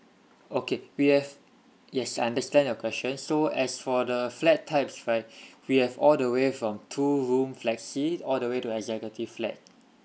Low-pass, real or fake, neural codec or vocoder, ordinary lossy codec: none; real; none; none